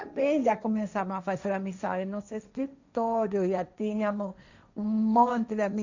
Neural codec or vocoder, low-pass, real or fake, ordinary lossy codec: codec, 16 kHz, 1.1 kbps, Voila-Tokenizer; 7.2 kHz; fake; none